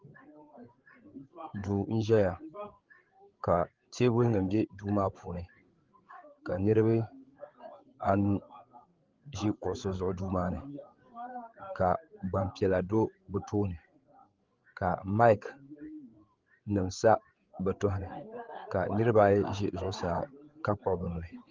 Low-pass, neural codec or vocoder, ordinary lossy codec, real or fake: 7.2 kHz; codec, 16 kHz, 8 kbps, FreqCodec, larger model; Opus, 16 kbps; fake